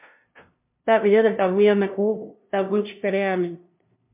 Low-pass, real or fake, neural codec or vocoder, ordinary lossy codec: 3.6 kHz; fake; codec, 16 kHz, 0.5 kbps, FunCodec, trained on LibriTTS, 25 frames a second; MP3, 32 kbps